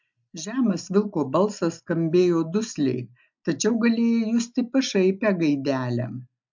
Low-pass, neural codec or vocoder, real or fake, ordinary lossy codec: 7.2 kHz; none; real; MP3, 64 kbps